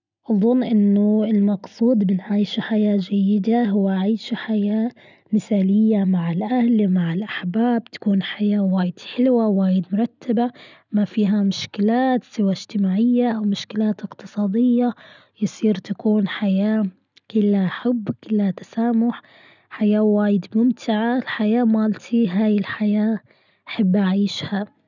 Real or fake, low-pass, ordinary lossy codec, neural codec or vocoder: real; 7.2 kHz; none; none